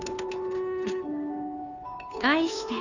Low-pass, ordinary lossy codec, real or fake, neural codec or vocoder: 7.2 kHz; none; fake; codec, 16 kHz, 2 kbps, FunCodec, trained on Chinese and English, 25 frames a second